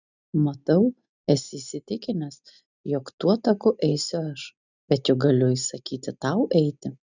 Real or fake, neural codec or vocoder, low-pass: real; none; 7.2 kHz